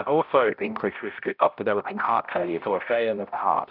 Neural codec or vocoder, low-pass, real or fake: codec, 16 kHz, 0.5 kbps, X-Codec, HuBERT features, trained on balanced general audio; 5.4 kHz; fake